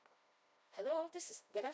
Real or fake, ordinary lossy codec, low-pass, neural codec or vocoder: fake; none; none; codec, 16 kHz, 1 kbps, FreqCodec, smaller model